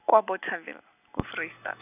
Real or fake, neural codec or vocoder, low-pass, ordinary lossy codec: real; none; 3.6 kHz; none